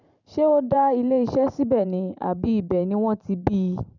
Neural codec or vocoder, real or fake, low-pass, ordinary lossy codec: vocoder, 44.1 kHz, 128 mel bands every 512 samples, BigVGAN v2; fake; 7.2 kHz; none